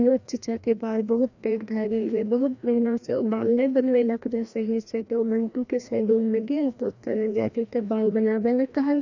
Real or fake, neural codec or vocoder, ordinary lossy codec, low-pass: fake; codec, 16 kHz, 1 kbps, FreqCodec, larger model; none; 7.2 kHz